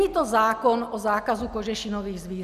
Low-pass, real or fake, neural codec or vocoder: 14.4 kHz; real; none